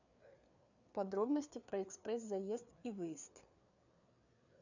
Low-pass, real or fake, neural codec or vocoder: 7.2 kHz; fake; codec, 16 kHz, 4 kbps, FreqCodec, larger model